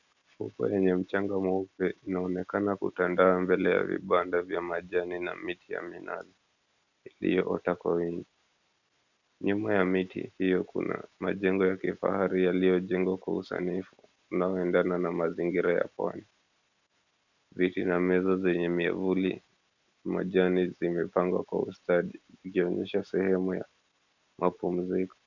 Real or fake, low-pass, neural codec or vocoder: real; 7.2 kHz; none